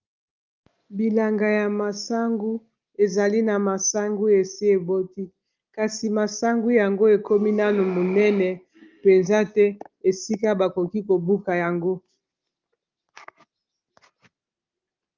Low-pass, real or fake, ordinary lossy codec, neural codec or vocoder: 7.2 kHz; real; Opus, 24 kbps; none